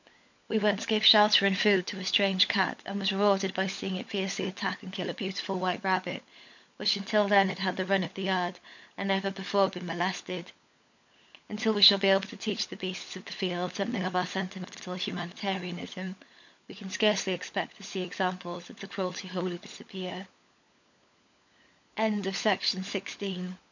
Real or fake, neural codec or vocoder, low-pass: fake; codec, 16 kHz, 16 kbps, FunCodec, trained on LibriTTS, 50 frames a second; 7.2 kHz